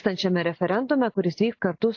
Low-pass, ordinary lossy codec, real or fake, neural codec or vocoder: 7.2 kHz; AAC, 48 kbps; real; none